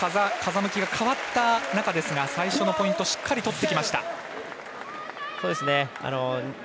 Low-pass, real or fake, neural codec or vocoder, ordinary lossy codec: none; real; none; none